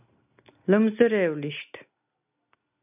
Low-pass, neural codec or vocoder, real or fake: 3.6 kHz; none; real